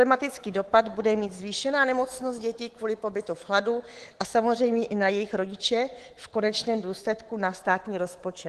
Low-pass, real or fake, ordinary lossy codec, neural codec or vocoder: 10.8 kHz; fake; Opus, 16 kbps; codec, 24 kHz, 3.1 kbps, DualCodec